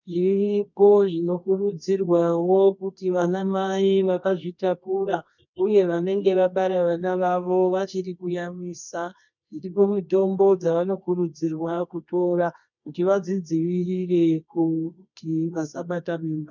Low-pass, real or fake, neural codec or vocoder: 7.2 kHz; fake; codec, 24 kHz, 0.9 kbps, WavTokenizer, medium music audio release